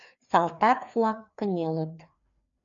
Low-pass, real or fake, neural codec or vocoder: 7.2 kHz; fake; codec, 16 kHz, 2 kbps, FreqCodec, larger model